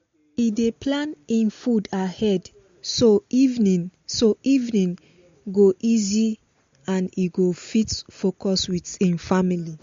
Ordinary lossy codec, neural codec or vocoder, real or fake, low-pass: MP3, 48 kbps; none; real; 7.2 kHz